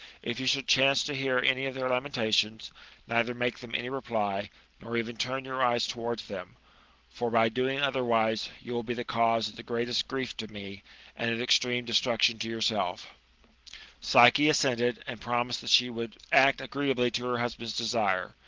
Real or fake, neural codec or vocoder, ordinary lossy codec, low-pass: real; none; Opus, 16 kbps; 7.2 kHz